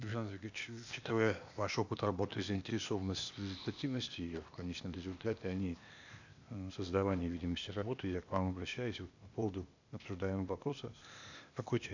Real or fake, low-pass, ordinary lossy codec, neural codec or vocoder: fake; 7.2 kHz; none; codec, 16 kHz, 0.8 kbps, ZipCodec